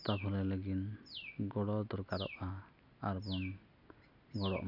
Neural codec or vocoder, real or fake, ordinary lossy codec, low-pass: none; real; none; 5.4 kHz